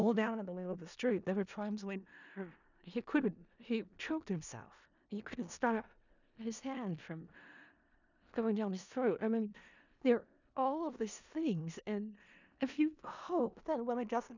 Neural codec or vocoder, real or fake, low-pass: codec, 16 kHz in and 24 kHz out, 0.4 kbps, LongCat-Audio-Codec, four codebook decoder; fake; 7.2 kHz